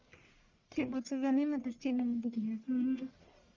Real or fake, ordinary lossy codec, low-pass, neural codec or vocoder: fake; Opus, 32 kbps; 7.2 kHz; codec, 44.1 kHz, 1.7 kbps, Pupu-Codec